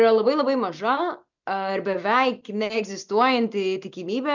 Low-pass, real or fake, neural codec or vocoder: 7.2 kHz; real; none